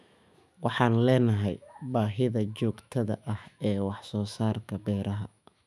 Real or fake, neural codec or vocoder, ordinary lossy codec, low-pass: fake; autoencoder, 48 kHz, 128 numbers a frame, DAC-VAE, trained on Japanese speech; none; 14.4 kHz